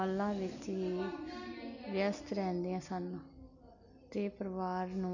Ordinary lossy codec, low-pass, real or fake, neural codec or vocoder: none; 7.2 kHz; real; none